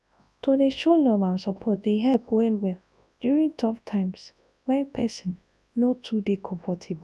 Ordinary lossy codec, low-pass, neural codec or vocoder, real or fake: none; none; codec, 24 kHz, 0.9 kbps, WavTokenizer, large speech release; fake